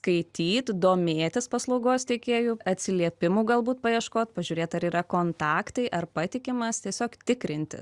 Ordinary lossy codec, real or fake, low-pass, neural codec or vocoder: Opus, 64 kbps; real; 10.8 kHz; none